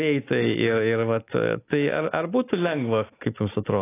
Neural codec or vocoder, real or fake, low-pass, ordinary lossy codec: none; real; 3.6 kHz; AAC, 24 kbps